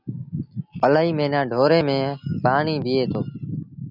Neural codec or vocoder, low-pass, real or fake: none; 5.4 kHz; real